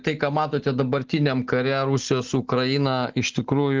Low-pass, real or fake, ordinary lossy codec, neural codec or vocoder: 7.2 kHz; real; Opus, 16 kbps; none